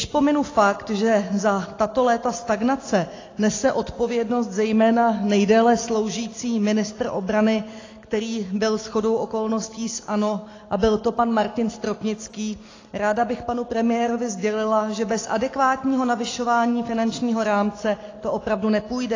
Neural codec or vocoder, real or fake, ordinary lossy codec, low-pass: none; real; AAC, 32 kbps; 7.2 kHz